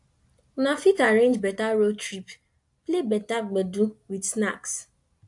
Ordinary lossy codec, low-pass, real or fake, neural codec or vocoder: AAC, 64 kbps; 10.8 kHz; real; none